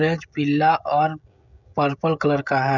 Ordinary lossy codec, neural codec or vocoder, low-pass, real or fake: none; codec, 16 kHz, 16 kbps, FreqCodec, smaller model; 7.2 kHz; fake